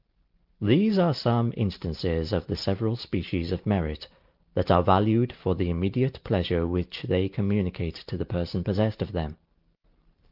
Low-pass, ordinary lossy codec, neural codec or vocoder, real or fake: 5.4 kHz; Opus, 32 kbps; none; real